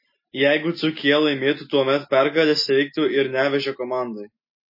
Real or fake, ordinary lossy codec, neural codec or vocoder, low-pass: real; MP3, 24 kbps; none; 5.4 kHz